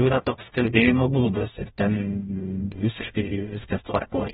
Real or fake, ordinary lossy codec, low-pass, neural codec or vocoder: fake; AAC, 16 kbps; 19.8 kHz; codec, 44.1 kHz, 0.9 kbps, DAC